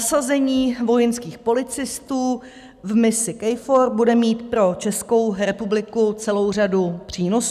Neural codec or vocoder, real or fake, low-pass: none; real; 14.4 kHz